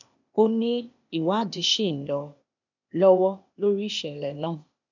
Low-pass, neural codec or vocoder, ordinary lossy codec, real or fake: 7.2 kHz; codec, 16 kHz, 0.8 kbps, ZipCodec; none; fake